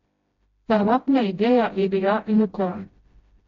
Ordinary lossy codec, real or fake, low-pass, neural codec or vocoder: AAC, 32 kbps; fake; 7.2 kHz; codec, 16 kHz, 0.5 kbps, FreqCodec, smaller model